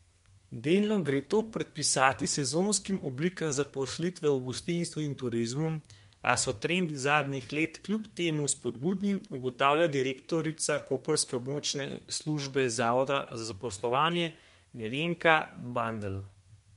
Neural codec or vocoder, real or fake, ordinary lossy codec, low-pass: codec, 24 kHz, 1 kbps, SNAC; fake; MP3, 64 kbps; 10.8 kHz